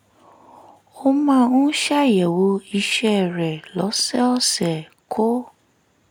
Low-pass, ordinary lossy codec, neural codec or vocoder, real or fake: none; none; none; real